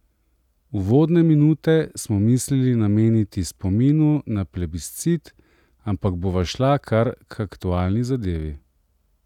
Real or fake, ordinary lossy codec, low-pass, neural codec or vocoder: real; none; 19.8 kHz; none